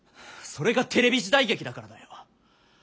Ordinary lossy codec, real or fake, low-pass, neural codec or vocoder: none; real; none; none